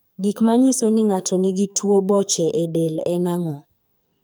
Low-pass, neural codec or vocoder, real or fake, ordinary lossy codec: none; codec, 44.1 kHz, 2.6 kbps, SNAC; fake; none